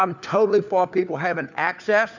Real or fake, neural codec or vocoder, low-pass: fake; codec, 16 kHz, 4 kbps, FunCodec, trained on LibriTTS, 50 frames a second; 7.2 kHz